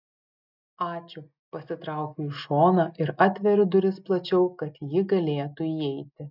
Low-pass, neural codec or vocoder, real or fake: 5.4 kHz; none; real